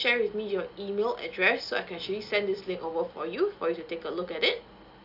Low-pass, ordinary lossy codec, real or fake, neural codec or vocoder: 5.4 kHz; none; real; none